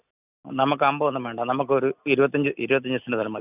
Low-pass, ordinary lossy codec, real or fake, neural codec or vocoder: 3.6 kHz; none; real; none